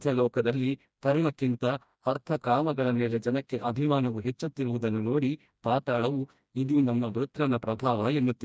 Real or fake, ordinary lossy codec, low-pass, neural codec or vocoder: fake; none; none; codec, 16 kHz, 1 kbps, FreqCodec, smaller model